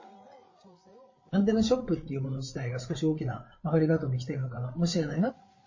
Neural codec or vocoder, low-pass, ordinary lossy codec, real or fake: codec, 16 kHz, 8 kbps, FreqCodec, larger model; 7.2 kHz; MP3, 32 kbps; fake